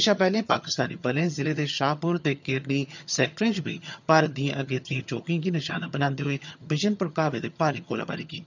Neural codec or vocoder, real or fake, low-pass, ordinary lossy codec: vocoder, 22.05 kHz, 80 mel bands, HiFi-GAN; fake; 7.2 kHz; none